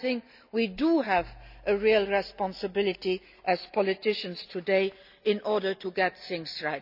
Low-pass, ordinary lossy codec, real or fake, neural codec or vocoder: 5.4 kHz; none; real; none